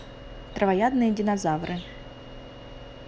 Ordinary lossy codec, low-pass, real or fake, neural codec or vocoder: none; none; real; none